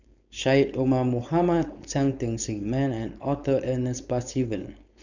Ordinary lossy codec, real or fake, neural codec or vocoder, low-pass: none; fake; codec, 16 kHz, 4.8 kbps, FACodec; 7.2 kHz